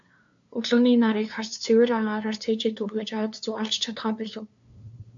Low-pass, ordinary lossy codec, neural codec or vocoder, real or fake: 7.2 kHz; AAC, 64 kbps; codec, 16 kHz, 2 kbps, FunCodec, trained on LibriTTS, 25 frames a second; fake